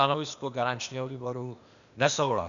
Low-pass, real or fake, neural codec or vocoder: 7.2 kHz; fake; codec, 16 kHz, 0.8 kbps, ZipCodec